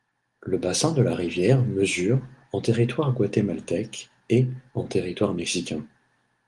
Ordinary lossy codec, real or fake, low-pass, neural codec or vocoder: Opus, 24 kbps; real; 10.8 kHz; none